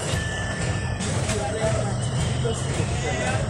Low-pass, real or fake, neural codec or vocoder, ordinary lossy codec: 19.8 kHz; fake; vocoder, 44.1 kHz, 128 mel bands every 512 samples, BigVGAN v2; none